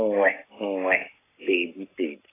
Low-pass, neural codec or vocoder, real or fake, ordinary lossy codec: 3.6 kHz; codec, 44.1 kHz, 7.8 kbps, Pupu-Codec; fake; AAC, 16 kbps